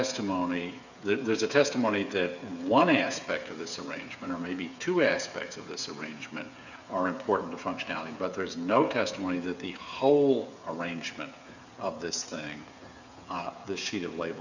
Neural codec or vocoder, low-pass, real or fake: codec, 16 kHz, 8 kbps, FreqCodec, smaller model; 7.2 kHz; fake